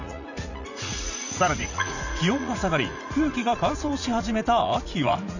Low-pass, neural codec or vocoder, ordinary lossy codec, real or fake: 7.2 kHz; vocoder, 44.1 kHz, 80 mel bands, Vocos; none; fake